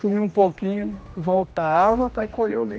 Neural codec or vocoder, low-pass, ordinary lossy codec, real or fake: codec, 16 kHz, 1 kbps, X-Codec, HuBERT features, trained on general audio; none; none; fake